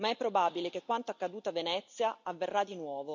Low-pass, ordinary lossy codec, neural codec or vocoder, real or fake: 7.2 kHz; none; none; real